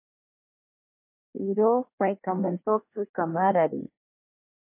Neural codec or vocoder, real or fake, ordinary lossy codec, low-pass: codec, 24 kHz, 1 kbps, SNAC; fake; MP3, 24 kbps; 3.6 kHz